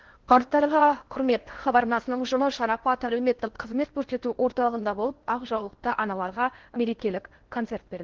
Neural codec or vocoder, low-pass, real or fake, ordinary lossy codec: codec, 16 kHz in and 24 kHz out, 0.8 kbps, FocalCodec, streaming, 65536 codes; 7.2 kHz; fake; Opus, 16 kbps